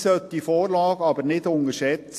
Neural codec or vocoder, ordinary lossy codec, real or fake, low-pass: none; AAC, 64 kbps; real; 14.4 kHz